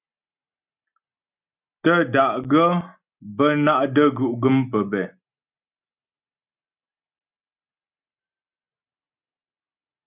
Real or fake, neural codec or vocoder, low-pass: real; none; 3.6 kHz